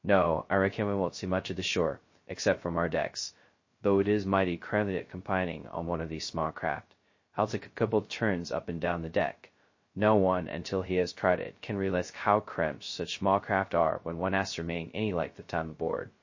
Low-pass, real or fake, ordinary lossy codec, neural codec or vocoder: 7.2 kHz; fake; MP3, 32 kbps; codec, 16 kHz, 0.2 kbps, FocalCodec